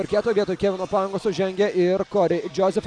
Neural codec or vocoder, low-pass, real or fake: none; 9.9 kHz; real